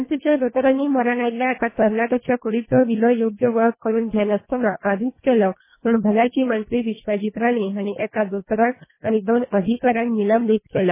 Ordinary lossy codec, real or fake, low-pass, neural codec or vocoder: MP3, 16 kbps; fake; 3.6 kHz; codec, 24 kHz, 1.5 kbps, HILCodec